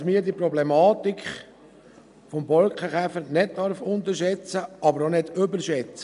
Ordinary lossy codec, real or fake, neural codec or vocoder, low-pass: MP3, 96 kbps; real; none; 10.8 kHz